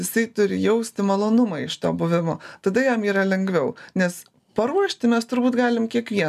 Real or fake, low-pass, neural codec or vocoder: real; 14.4 kHz; none